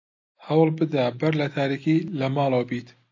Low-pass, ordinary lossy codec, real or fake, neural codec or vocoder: 7.2 kHz; AAC, 48 kbps; real; none